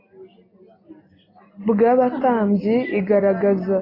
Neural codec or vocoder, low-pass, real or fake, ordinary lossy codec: none; 5.4 kHz; real; AAC, 24 kbps